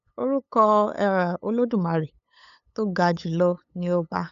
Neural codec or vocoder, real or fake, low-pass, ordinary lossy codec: codec, 16 kHz, 8 kbps, FunCodec, trained on LibriTTS, 25 frames a second; fake; 7.2 kHz; none